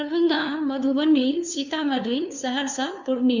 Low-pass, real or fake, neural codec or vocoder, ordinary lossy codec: 7.2 kHz; fake; codec, 16 kHz, 2 kbps, FunCodec, trained on LibriTTS, 25 frames a second; Opus, 64 kbps